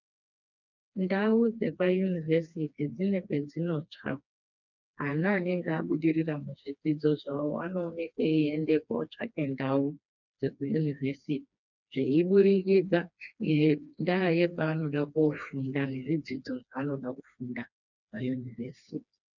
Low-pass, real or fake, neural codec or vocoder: 7.2 kHz; fake; codec, 16 kHz, 2 kbps, FreqCodec, smaller model